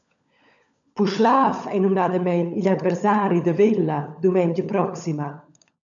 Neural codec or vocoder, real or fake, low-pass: codec, 16 kHz, 16 kbps, FunCodec, trained on LibriTTS, 50 frames a second; fake; 7.2 kHz